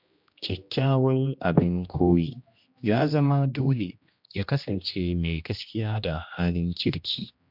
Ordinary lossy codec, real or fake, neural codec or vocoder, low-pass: MP3, 48 kbps; fake; codec, 16 kHz, 1 kbps, X-Codec, HuBERT features, trained on general audio; 5.4 kHz